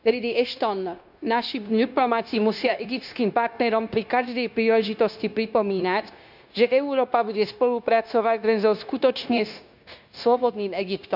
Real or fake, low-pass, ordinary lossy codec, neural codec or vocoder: fake; 5.4 kHz; none; codec, 16 kHz, 0.9 kbps, LongCat-Audio-Codec